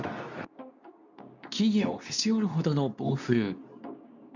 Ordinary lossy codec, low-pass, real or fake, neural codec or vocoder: none; 7.2 kHz; fake; codec, 24 kHz, 0.9 kbps, WavTokenizer, medium speech release version 2